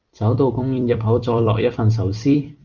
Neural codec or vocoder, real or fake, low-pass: vocoder, 44.1 kHz, 128 mel bands every 256 samples, BigVGAN v2; fake; 7.2 kHz